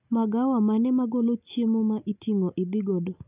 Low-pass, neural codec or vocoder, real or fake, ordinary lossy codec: 3.6 kHz; none; real; none